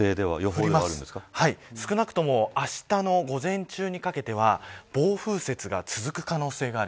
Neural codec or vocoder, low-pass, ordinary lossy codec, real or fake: none; none; none; real